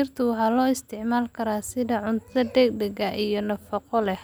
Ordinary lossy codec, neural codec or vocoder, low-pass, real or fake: none; none; none; real